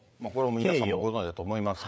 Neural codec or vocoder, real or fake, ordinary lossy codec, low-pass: codec, 16 kHz, 8 kbps, FreqCodec, larger model; fake; none; none